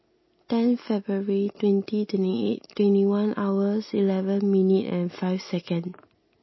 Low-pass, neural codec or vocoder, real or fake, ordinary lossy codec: 7.2 kHz; none; real; MP3, 24 kbps